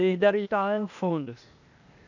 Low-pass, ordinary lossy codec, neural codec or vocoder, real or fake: 7.2 kHz; none; codec, 16 kHz, 0.8 kbps, ZipCodec; fake